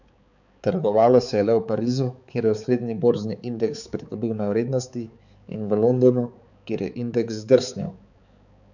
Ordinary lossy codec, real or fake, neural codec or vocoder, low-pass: none; fake; codec, 16 kHz, 4 kbps, X-Codec, HuBERT features, trained on balanced general audio; 7.2 kHz